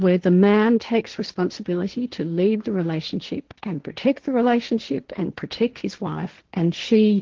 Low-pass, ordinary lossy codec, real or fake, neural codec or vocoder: 7.2 kHz; Opus, 16 kbps; fake; codec, 16 kHz, 1.1 kbps, Voila-Tokenizer